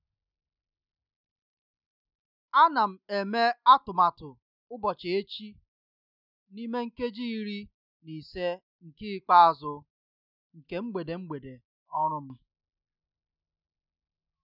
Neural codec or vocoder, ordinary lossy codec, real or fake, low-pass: none; none; real; 5.4 kHz